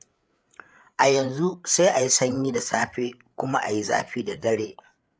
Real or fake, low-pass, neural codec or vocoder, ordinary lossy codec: fake; none; codec, 16 kHz, 8 kbps, FreqCodec, larger model; none